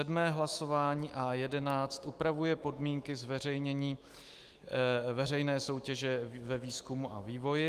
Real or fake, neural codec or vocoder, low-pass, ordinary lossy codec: fake; autoencoder, 48 kHz, 128 numbers a frame, DAC-VAE, trained on Japanese speech; 14.4 kHz; Opus, 32 kbps